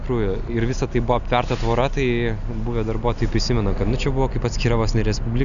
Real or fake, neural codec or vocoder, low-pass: real; none; 7.2 kHz